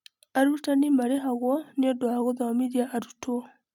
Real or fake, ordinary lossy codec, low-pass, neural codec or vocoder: fake; none; 19.8 kHz; vocoder, 44.1 kHz, 128 mel bands every 256 samples, BigVGAN v2